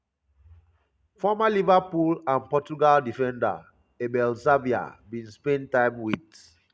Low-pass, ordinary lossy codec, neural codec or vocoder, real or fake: none; none; none; real